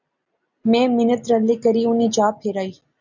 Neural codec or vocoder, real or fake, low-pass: none; real; 7.2 kHz